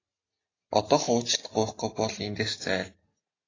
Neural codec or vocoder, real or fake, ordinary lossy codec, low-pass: none; real; AAC, 32 kbps; 7.2 kHz